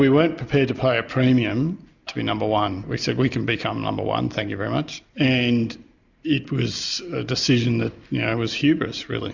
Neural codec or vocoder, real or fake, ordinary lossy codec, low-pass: none; real; Opus, 64 kbps; 7.2 kHz